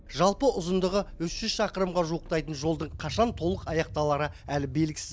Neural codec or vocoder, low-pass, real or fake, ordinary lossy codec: none; none; real; none